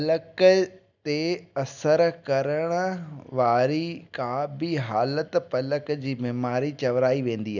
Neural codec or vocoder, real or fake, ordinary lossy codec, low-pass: none; real; none; 7.2 kHz